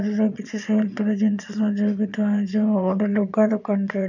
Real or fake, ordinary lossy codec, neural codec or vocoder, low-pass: fake; none; vocoder, 22.05 kHz, 80 mel bands, Vocos; 7.2 kHz